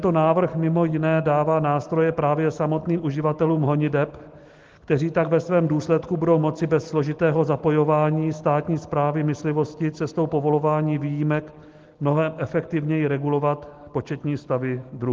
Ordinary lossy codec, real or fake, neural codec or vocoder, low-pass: Opus, 16 kbps; real; none; 7.2 kHz